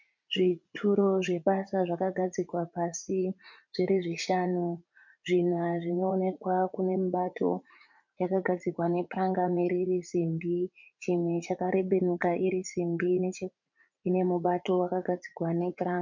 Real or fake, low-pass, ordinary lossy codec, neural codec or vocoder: fake; 7.2 kHz; MP3, 64 kbps; vocoder, 44.1 kHz, 80 mel bands, Vocos